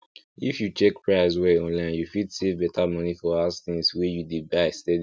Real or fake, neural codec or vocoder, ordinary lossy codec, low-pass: real; none; none; none